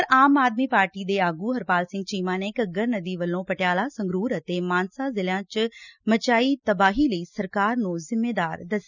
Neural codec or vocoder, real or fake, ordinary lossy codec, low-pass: none; real; none; 7.2 kHz